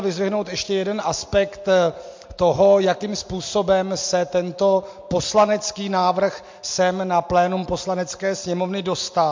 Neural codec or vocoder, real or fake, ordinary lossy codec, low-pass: none; real; MP3, 48 kbps; 7.2 kHz